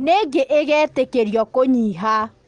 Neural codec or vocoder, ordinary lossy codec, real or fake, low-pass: none; Opus, 24 kbps; real; 9.9 kHz